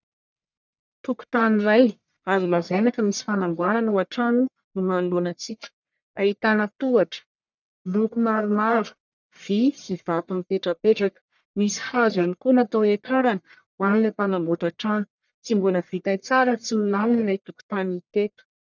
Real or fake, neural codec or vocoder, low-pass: fake; codec, 44.1 kHz, 1.7 kbps, Pupu-Codec; 7.2 kHz